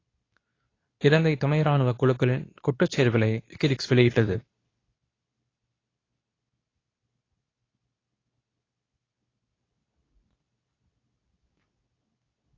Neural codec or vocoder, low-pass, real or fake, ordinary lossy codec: codec, 24 kHz, 0.9 kbps, WavTokenizer, medium speech release version 2; 7.2 kHz; fake; AAC, 32 kbps